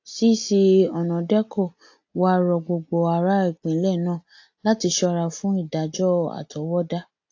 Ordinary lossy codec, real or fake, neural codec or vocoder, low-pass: AAC, 48 kbps; real; none; 7.2 kHz